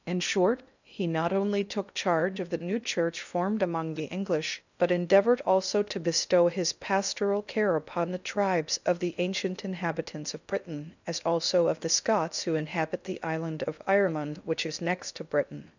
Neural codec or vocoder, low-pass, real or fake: codec, 16 kHz in and 24 kHz out, 0.6 kbps, FocalCodec, streaming, 2048 codes; 7.2 kHz; fake